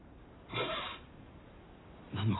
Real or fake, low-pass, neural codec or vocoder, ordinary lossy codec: real; 7.2 kHz; none; AAC, 16 kbps